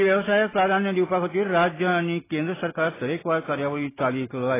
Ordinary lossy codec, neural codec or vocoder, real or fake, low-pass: AAC, 16 kbps; none; real; 3.6 kHz